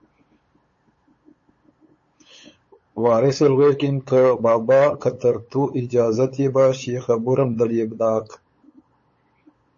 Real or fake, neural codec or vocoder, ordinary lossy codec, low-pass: fake; codec, 16 kHz, 8 kbps, FunCodec, trained on LibriTTS, 25 frames a second; MP3, 32 kbps; 7.2 kHz